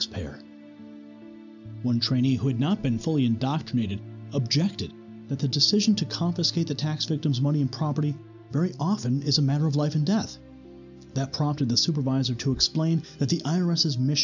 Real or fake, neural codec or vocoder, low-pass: real; none; 7.2 kHz